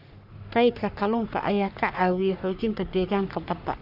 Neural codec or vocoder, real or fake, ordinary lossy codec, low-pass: codec, 44.1 kHz, 3.4 kbps, Pupu-Codec; fake; none; 5.4 kHz